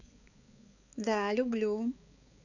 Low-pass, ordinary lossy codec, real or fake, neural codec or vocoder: 7.2 kHz; none; fake; codec, 16 kHz, 4 kbps, X-Codec, HuBERT features, trained on balanced general audio